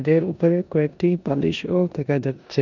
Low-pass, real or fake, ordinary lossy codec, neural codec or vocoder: 7.2 kHz; fake; none; codec, 16 kHz in and 24 kHz out, 0.9 kbps, LongCat-Audio-Codec, four codebook decoder